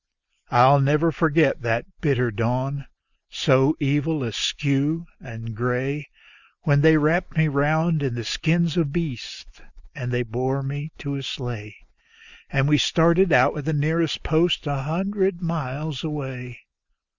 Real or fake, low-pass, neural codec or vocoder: real; 7.2 kHz; none